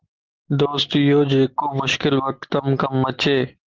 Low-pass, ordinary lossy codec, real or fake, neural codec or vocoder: 7.2 kHz; Opus, 16 kbps; real; none